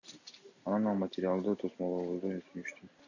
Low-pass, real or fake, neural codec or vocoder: 7.2 kHz; real; none